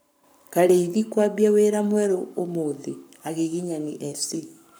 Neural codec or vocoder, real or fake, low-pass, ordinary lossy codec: codec, 44.1 kHz, 7.8 kbps, Pupu-Codec; fake; none; none